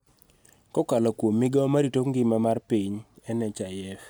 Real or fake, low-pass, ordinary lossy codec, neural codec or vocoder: real; none; none; none